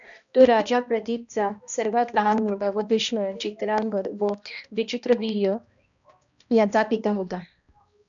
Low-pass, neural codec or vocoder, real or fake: 7.2 kHz; codec, 16 kHz, 1 kbps, X-Codec, HuBERT features, trained on balanced general audio; fake